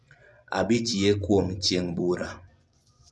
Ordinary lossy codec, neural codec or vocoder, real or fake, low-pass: none; vocoder, 24 kHz, 100 mel bands, Vocos; fake; none